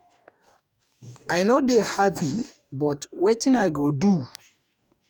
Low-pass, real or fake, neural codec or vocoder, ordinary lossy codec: 19.8 kHz; fake; codec, 44.1 kHz, 2.6 kbps, DAC; none